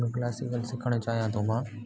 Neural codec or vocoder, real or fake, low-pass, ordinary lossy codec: none; real; none; none